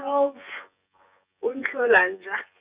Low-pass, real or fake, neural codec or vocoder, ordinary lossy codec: 3.6 kHz; fake; vocoder, 24 kHz, 100 mel bands, Vocos; Opus, 64 kbps